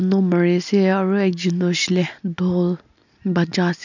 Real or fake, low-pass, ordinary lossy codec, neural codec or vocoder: real; 7.2 kHz; none; none